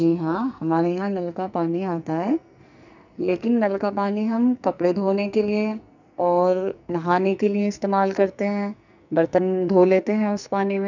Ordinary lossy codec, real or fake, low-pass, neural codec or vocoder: none; fake; 7.2 kHz; codec, 44.1 kHz, 2.6 kbps, SNAC